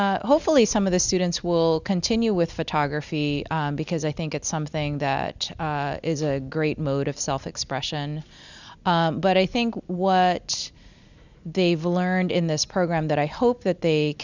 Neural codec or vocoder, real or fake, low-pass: none; real; 7.2 kHz